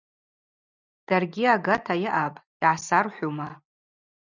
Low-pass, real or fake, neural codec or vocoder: 7.2 kHz; real; none